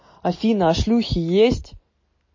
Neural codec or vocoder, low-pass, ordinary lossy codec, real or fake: none; 7.2 kHz; MP3, 32 kbps; real